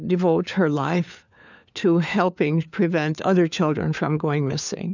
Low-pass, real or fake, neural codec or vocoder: 7.2 kHz; fake; codec, 16 kHz, 4 kbps, FunCodec, trained on LibriTTS, 50 frames a second